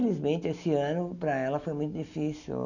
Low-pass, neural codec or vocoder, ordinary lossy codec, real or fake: 7.2 kHz; none; none; real